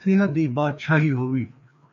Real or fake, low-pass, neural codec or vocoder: fake; 7.2 kHz; codec, 16 kHz, 2 kbps, FreqCodec, larger model